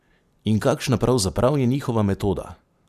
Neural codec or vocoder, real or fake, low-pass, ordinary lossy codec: none; real; 14.4 kHz; AAC, 96 kbps